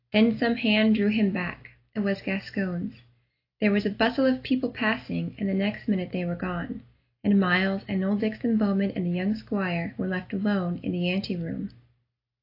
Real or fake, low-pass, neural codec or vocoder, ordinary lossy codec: real; 5.4 kHz; none; AAC, 32 kbps